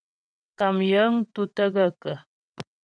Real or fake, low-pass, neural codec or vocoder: fake; 9.9 kHz; vocoder, 22.05 kHz, 80 mel bands, WaveNeXt